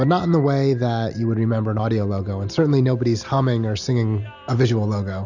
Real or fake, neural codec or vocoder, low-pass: real; none; 7.2 kHz